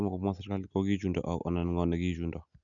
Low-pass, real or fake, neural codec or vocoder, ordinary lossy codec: 7.2 kHz; real; none; none